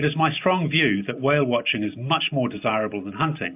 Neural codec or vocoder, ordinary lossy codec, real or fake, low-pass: none; AAC, 32 kbps; real; 3.6 kHz